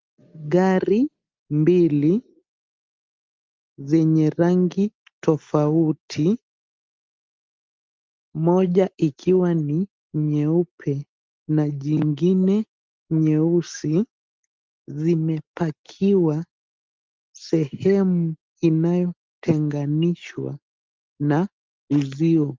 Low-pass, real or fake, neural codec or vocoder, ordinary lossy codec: 7.2 kHz; real; none; Opus, 32 kbps